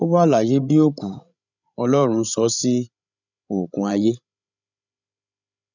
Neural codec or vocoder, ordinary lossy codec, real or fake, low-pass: codec, 16 kHz, 16 kbps, FreqCodec, larger model; none; fake; 7.2 kHz